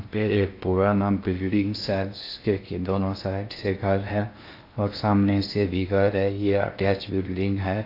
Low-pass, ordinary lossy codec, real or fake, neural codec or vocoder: 5.4 kHz; AAC, 32 kbps; fake; codec, 16 kHz in and 24 kHz out, 0.6 kbps, FocalCodec, streaming, 2048 codes